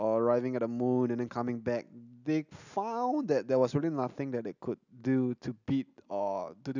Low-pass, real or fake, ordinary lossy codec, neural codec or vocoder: 7.2 kHz; real; none; none